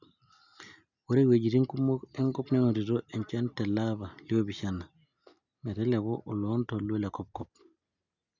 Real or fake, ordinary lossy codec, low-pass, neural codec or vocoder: real; none; 7.2 kHz; none